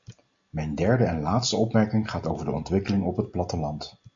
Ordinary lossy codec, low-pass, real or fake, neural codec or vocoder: MP3, 48 kbps; 7.2 kHz; real; none